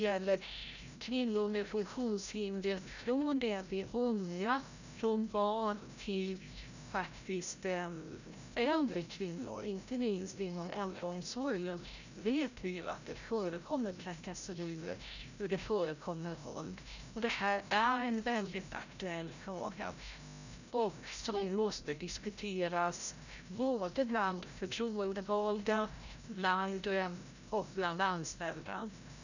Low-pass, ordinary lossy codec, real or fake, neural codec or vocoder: 7.2 kHz; none; fake; codec, 16 kHz, 0.5 kbps, FreqCodec, larger model